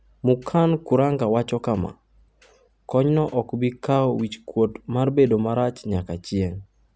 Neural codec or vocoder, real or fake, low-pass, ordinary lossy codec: none; real; none; none